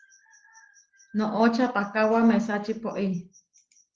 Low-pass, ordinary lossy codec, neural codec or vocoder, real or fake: 7.2 kHz; Opus, 16 kbps; none; real